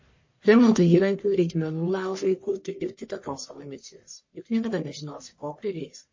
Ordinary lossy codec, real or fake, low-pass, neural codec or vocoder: MP3, 32 kbps; fake; 7.2 kHz; codec, 44.1 kHz, 1.7 kbps, Pupu-Codec